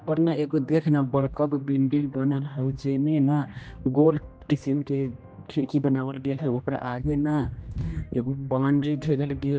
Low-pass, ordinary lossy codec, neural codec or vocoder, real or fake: none; none; codec, 16 kHz, 1 kbps, X-Codec, HuBERT features, trained on general audio; fake